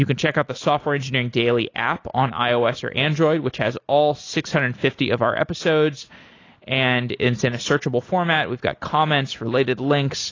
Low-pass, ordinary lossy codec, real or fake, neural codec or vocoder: 7.2 kHz; AAC, 32 kbps; real; none